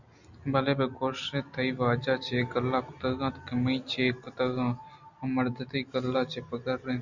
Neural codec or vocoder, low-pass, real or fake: none; 7.2 kHz; real